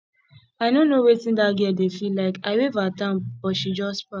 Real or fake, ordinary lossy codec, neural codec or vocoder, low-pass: real; none; none; none